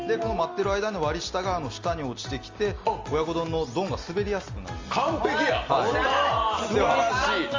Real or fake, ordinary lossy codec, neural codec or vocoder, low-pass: real; Opus, 32 kbps; none; 7.2 kHz